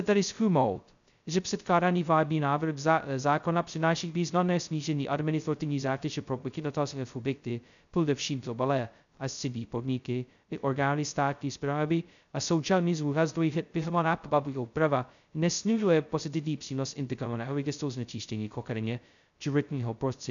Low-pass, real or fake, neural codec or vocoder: 7.2 kHz; fake; codec, 16 kHz, 0.2 kbps, FocalCodec